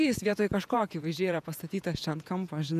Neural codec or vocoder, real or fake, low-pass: vocoder, 48 kHz, 128 mel bands, Vocos; fake; 14.4 kHz